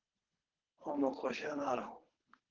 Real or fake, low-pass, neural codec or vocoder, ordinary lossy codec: fake; 7.2 kHz; codec, 24 kHz, 3 kbps, HILCodec; Opus, 16 kbps